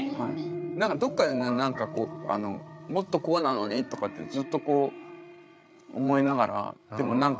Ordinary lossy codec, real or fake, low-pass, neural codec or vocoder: none; fake; none; codec, 16 kHz, 8 kbps, FreqCodec, larger model